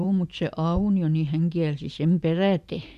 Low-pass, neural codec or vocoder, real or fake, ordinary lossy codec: 14.4 kHz; vocoder, 44.1 kHz, 128 mel bands every 512 samples, BigVGAN v2; fake; none